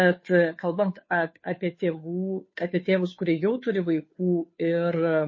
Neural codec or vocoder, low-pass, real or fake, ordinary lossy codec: codec, 16 kHz, 2 kbps, FunCodec, trained on Chinese and English, 25 frames a second; 7.2 kHz; fake; MP3, 32 kbps